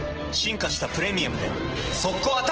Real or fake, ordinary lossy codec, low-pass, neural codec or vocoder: real; Opus, 16 kbps; 7.2 kHz; none